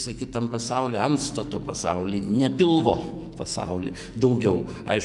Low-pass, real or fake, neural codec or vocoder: 10.8 kHz; fake; codec, 44.1 kHz, 2.6 kbps, SNAC